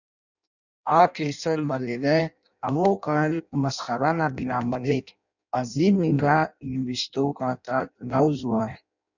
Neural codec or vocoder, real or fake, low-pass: codec, 16 kHz in and 24 kHz out, 0.6 kbps, FireRedTTS-2 codec; fake; 7.2 kHz